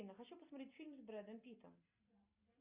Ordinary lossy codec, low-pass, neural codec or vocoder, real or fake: Opus, 64 kbps; 3.6 kHz; none; real